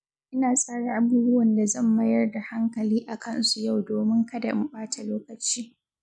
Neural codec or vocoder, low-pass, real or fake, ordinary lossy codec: none; none; real; none